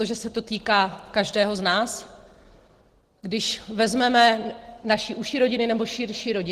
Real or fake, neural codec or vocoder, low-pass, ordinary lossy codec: real; none; 14.4 kHz; Opus, 16 kbps